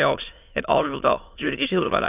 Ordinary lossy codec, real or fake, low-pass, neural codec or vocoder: none; fake; 3.6 kHz; autoencoder, 22.05 kHz, a latent of 192 numbers a frame, VITS, trained on many speakers